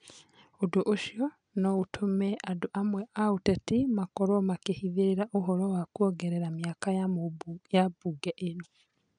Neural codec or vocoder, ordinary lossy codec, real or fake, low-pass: none; none; real; 9.9 kHz